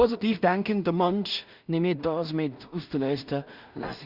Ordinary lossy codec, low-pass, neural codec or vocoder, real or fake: Opus, 64 kbps; 5.4 kHz; codec, 16 kHz in and 24 kHz out, 0.4 kbps, LongCat-Audio-Codec, two codebook decoder; fake